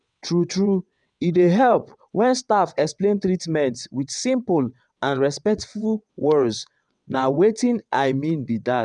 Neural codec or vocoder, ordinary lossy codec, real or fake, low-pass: vocoder, 22.05 kHz, 80 mel bands, WaveNeXt; none; fake; 9.9 kHz